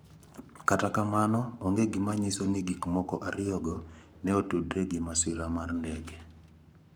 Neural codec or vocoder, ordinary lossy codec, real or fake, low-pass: codec, 44.1 kHz, 7.8 kbps, Pupu-Codec; none; fake; none